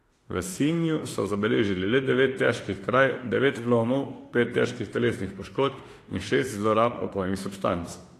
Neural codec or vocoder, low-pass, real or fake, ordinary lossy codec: autoencoder, 48 kHz, 32 numbers a frame, DAC-VAE, trained on Japanese speech; 14.4 kHz; fake; AAC, 48 kbps